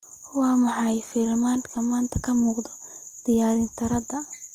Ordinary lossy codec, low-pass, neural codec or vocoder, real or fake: Opus, 24 kbps; 14.4 kHz; none; real